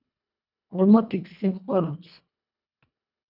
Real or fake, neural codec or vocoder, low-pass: fake; codec, 24 kHz, 1.5 kbps, HILCodec; 5.4 kHz